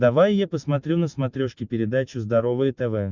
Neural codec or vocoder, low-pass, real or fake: none; 7.2 kHz; real